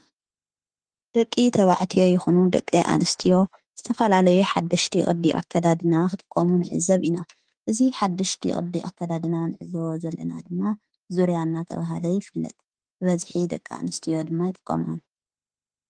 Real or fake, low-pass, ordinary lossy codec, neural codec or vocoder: fake; 9.9 kHz; Opus, 24 kbps; autoencoder, 48 kHz, 32 numbers a frame, DAC-VAE, trained on Japanese speech